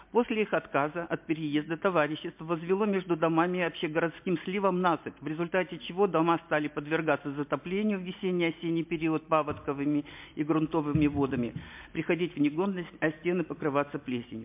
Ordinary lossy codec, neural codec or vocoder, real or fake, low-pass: MP3, 32 kbps; none; real; 3.6 kHz